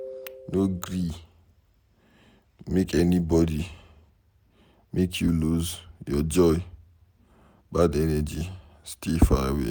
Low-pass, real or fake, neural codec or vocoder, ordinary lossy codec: none; real; none; none